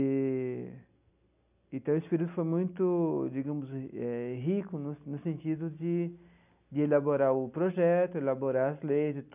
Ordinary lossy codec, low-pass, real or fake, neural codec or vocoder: none; 3.6 kHz; real; none